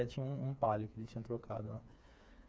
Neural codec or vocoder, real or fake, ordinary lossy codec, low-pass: codec, 16 kHz, 4 kbps, FreqCodec, smaller model; fake; none; none